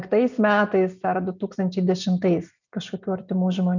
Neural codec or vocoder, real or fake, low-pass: none; real; 7.2 kHz